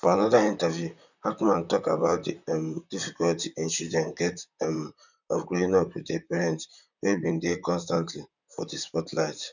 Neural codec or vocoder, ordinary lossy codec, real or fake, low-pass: vocoder, 44.1 kHz, 128 mel bands, Pupu-Vocoder; none; fake; 7.2 kHz